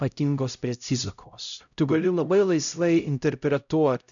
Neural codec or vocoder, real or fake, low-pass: codec, 16 kHz, 0.5 kbps, X-Codec, HuBERT features, trained on LibriSpeech; fake; 7.2 kHz